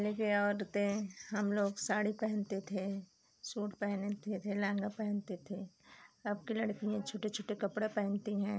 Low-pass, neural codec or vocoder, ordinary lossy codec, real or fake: none; none; none; real